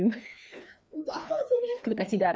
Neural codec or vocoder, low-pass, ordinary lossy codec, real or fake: codec, 16 kHz, 2 kbps, FreqCodec, larger model; none; none; fake